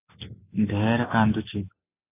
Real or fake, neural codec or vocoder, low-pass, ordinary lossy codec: real; none; 3.6 kHz; AAC, 24 kbps